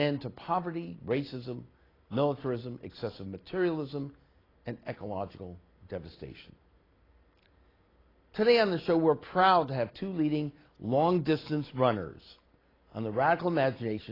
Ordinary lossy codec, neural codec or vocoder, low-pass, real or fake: AAC, 24 kbps; none; 5.4 kHz; real